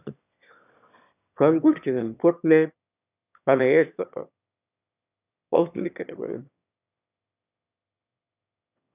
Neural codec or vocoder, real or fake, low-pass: autoencoder, 22.05 kHz, a latent of 192 numbers a frame, VITS, trained on one speaker; fake; 3.6 kHz